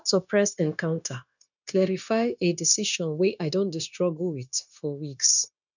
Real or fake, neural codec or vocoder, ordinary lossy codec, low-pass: fake; codec, 16 kHz, 0.9 kbps, LongCat-Audio-Codec; none; 7.2 kHz